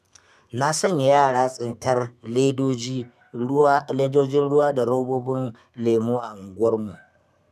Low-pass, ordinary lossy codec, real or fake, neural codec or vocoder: 14.4 kHz; none; fake; codec, 32 kHz, 1.9 kbps, SNAC